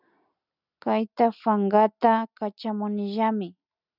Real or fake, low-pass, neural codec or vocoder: real; 5.4 kHz; none